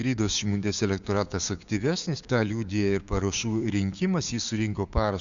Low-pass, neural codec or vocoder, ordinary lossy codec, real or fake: 7.2 kHz; codec, 16 kHz, 6 kbps, DAC; Opus, 64 kbps; fake